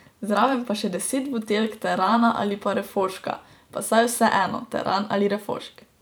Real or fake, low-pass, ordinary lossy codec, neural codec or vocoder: fake; none; none; vocoder, 44.1 kHz, 128 mel bands every 512 samples, BigVGAN v2